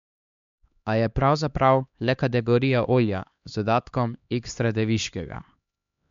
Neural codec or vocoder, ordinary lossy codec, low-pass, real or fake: codec, 16 kHz, 2 kbps, X-Codec, HuBERT features, trained on LibriSpeech; MP3, 64 kbps; 7.2 kHz; fake